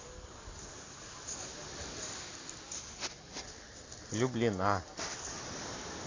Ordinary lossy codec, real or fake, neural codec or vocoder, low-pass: AAC, 32 kbps; real; none; 7.2 kHz